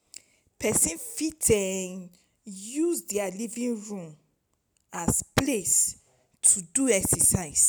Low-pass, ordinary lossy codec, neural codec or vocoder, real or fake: none; none; none; real